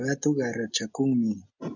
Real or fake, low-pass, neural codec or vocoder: real; 7.2 kHz; none